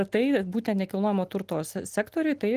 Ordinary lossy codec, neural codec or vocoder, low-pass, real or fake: Opus, 24 kbps; none; 14.4 kHz; real